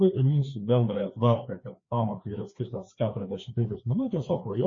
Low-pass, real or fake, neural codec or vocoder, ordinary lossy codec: 7.2 kHz; fake; codec, 16 kHz, 2 kbps, FreqCodec, larger model; MP3, 32 kbps